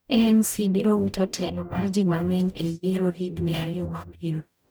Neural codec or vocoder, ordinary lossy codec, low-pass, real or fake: codec, 44.1 kHz, 0.9 kbps, DAC; none; none; fake